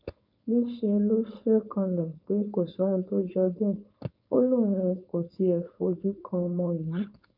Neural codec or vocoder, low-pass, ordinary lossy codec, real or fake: codec, 16 kHz, 4.8 kbps, FACodec; 5.4 kHz; none; fake